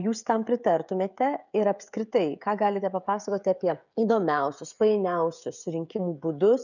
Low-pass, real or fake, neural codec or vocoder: 7.2 kHz; fake; codec, 16 kHz, 16 kbps, FreqCodec, smaller model